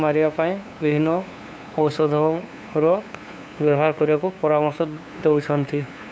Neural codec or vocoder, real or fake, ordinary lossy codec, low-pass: codec, 16 kHz, 2 kbps, FunCodec, trained on LibriTTS, 25 frames a second; fake; none; none